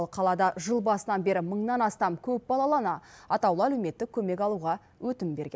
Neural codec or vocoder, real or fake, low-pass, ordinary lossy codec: none; real; none; none